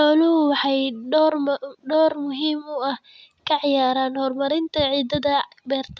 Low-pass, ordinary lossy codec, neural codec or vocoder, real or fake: none; none; none; real